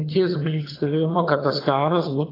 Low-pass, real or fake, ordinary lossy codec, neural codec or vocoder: 5.4 kHz; fake; AAC, 24 kbps; vocoder, 22.05 kHz, 80 mel bands, HiFi-GAN